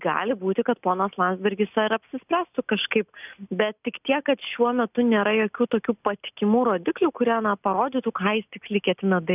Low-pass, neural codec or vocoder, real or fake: 3.6 kHz; none; real